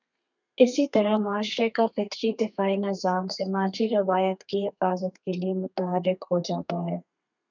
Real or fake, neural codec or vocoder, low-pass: fake; codec, 32 kHz, 1.9 kbps, SNAC; 7.2 kHz